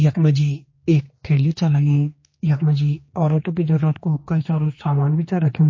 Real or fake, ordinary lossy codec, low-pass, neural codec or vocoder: fake; MP3, 32 kbps; 7.2 kHz; codec, 16 kHz, 2 kbps, X-Codec, HuBERT features, trained on general audio